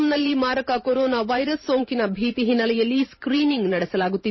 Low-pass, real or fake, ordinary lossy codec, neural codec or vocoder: 7.2 kHz; fake; MP3, 24 kbps; vocoder, 44.1 kHz, 128 mel bands every 512 samples, BigVGAN v2